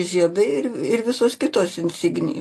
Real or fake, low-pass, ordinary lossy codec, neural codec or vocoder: real; 14.4 kHz; AAC, 64 kbps; none